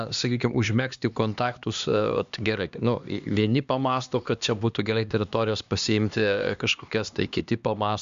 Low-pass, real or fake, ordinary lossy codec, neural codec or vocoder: 7.2 kHz; fake; Opus, 64 kbps; codec, 16 kHz, 2 kbps, X-Codec, HuBERT features, trained on LibriSpeech